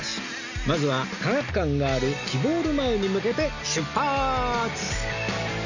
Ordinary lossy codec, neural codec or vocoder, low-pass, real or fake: none; none; 7.2 kHz; real